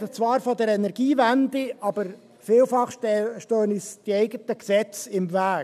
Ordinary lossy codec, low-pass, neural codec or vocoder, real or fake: none; 14.4 kHz; none; real